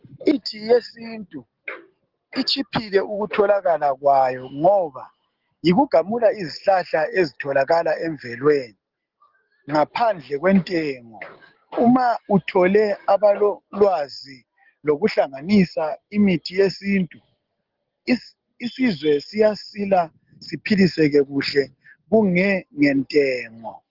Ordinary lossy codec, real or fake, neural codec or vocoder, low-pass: Opus, 16 kbps; real; none; 5.4 kHz